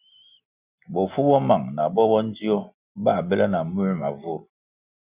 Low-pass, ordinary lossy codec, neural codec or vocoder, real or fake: 3.6 kHz; Opus, 64 kbps; none; real